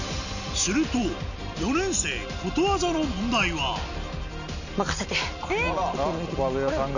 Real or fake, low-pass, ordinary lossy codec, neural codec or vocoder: real; 7.2 kHz; none; none